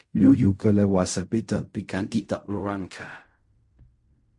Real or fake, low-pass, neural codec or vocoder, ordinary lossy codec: fake; 10.8 kHz; codec, 16 kHz in and 24 kHz out, 0.4 kbps, LongCat-Audio-Codec, fine tuned four codebook decoder; MP3, 48 kbps